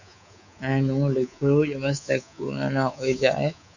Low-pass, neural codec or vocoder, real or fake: 7.2 kHz; codec, 24 kHz, 3.1 kbps, DualCodec; fake